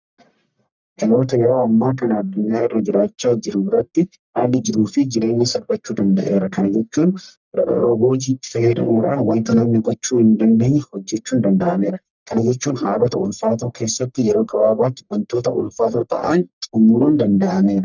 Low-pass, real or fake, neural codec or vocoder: 7.2 kHz; fake; codec, 44.1 kHz, 1.7 kbps, Pupu-Codec